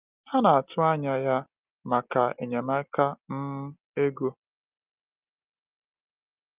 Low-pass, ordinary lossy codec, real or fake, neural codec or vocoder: 3.6 kHz; Opus, 24 kbps; real; none